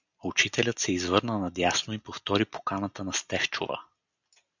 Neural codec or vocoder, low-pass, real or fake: none; 7.2 kHz; real